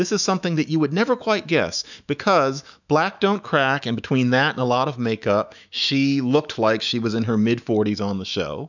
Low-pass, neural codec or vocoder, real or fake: 7.2 kHz; autoencoder, 48 kHz, 128 numbers a frame, DAC-VAE, trained on Japanese speech; fake